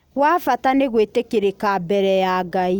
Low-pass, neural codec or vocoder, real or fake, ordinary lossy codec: 19.8 kHz; none; real; none